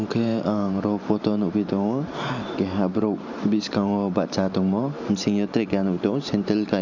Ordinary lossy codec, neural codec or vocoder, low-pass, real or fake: none; vocoder, 44.1 kHz, 128 mel bands every 512 samples, BigVGAN v2; 7.2 kHz; fake